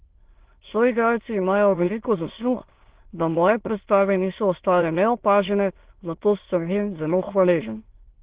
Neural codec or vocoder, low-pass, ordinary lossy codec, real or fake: autoencoder, 22.05 kHz, a latent of 192 numbers a frame, VITS, trained on many speakers; 3.6 kHz; Opus, 16 kbps; fake